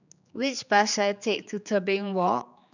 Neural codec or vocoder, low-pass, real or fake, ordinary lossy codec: codec, 16 kHz, 4 kbps, X-Codec, HuBERT features, trained on general audio; 7.2 kHz; fake; none